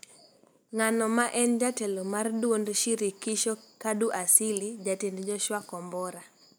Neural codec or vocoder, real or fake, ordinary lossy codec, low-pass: none; real; none; none